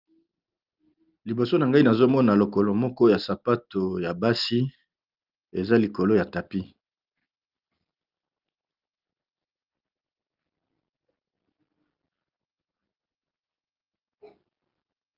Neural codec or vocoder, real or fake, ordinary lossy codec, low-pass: none; real; Opus, 24 kbps; 5.4 kHz